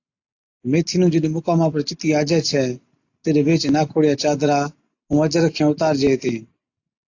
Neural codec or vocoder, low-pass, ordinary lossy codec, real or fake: none; 7.2 kHz; AAC, 48 kbps; real